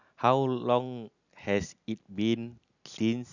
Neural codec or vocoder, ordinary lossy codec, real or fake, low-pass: none; none; real; 7.2 kHz